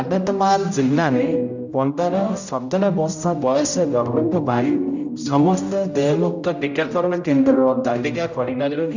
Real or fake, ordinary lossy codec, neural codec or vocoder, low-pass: fake; none; codec, 16 kHz, 0.5 kbps, X-Codec, HuBERT features, trained on general audio; 7.2 kHz